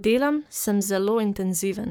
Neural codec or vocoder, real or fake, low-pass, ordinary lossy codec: codec, 44.1 kHz, 7.8 kbps, Pupu-Codec; fake; none; none